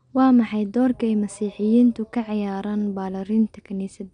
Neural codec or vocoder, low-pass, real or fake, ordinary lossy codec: none; 9.9 kHz; real; none